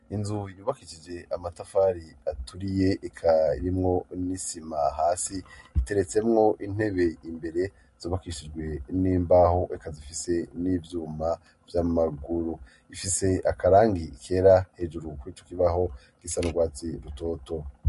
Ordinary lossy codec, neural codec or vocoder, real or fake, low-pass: MP3, 48 kbps; none; real; 14.4 kHz